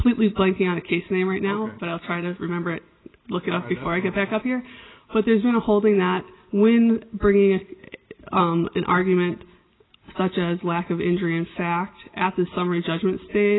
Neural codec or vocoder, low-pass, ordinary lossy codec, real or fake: none; 7.2 kHz; AAC, 16 kbps; real